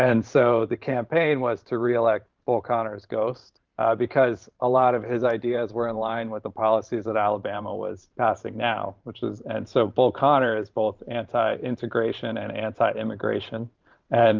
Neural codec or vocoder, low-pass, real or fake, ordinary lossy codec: vocoder, 44.1 kHz, 128 mel bands every 512 samples, BigVGAN v2; 7.2 kHz; fake; Opus, 24 kbps